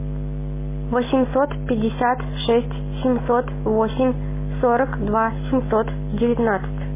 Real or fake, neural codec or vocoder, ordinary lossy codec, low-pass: real; none; MP3, 16 kbps; 3.6 kHz